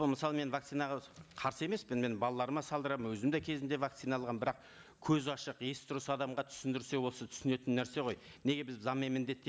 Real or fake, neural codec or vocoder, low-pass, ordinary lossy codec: real; none; none; none